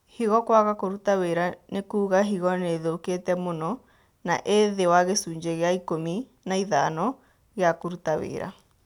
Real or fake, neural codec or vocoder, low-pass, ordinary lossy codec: real; none; 19.8 kHz; none